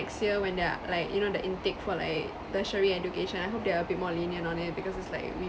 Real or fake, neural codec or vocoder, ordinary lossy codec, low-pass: real; none; none; none